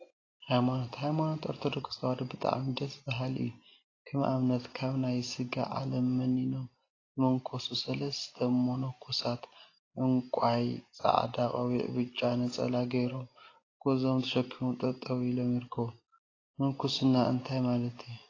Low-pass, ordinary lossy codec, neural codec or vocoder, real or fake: 7.2 kHz; AAC, 32 kbps; none; real